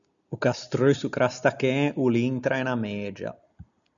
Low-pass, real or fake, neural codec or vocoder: 7.2 kHz; real; none